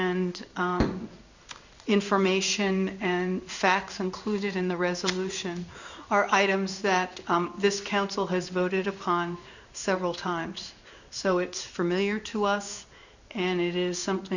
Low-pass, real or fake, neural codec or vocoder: 7.2 kHz; fake; codec, 16 kHz in and 24 kHz out, 1 kbps, XY-Tokenizer